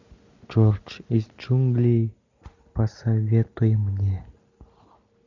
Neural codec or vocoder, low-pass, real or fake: none; 7.2 kHz; real